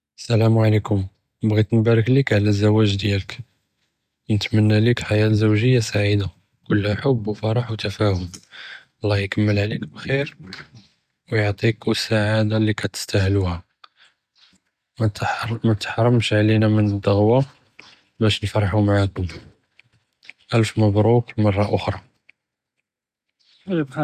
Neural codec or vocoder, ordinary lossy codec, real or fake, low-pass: none; none; real; 14.4 kHz